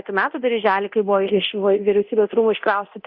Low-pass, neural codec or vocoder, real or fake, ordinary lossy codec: 5.4 kHz; codec, 24 kHz, 0.9 kbps, DualCodec; fake; Opus, 64 kbps